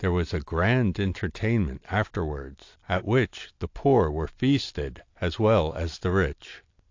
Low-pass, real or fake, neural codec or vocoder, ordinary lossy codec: 7.2 kHz; real; none; AAC, 48 kbps